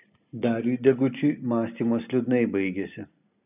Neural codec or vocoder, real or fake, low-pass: none; real; 3.6 kHz